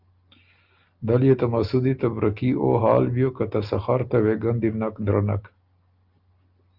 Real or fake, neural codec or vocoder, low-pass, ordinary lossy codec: real; none; 5.4 kHz; Opus, 24 kbps